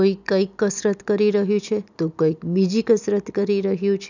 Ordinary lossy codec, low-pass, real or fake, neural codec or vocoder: none; 7.2 kHz; real; none